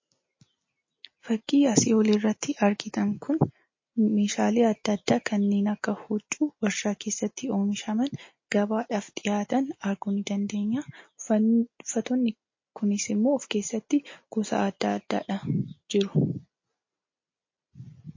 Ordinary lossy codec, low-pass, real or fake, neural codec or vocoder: MP3, 32 kbps; 7.2 kHz; real; none